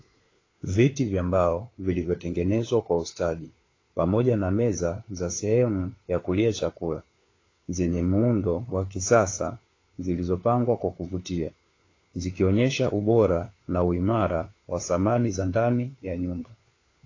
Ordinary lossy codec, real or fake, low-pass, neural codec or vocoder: AAC, 32 kbps; fake; 7.2 kHz; codec, 16 kHz, 4 kbps, FunCodec, trained on LibriTTS, 50 frames a second